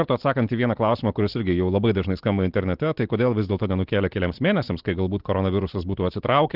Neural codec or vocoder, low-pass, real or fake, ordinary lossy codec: none; 5.4 kHz; real; Opus, 16 kbps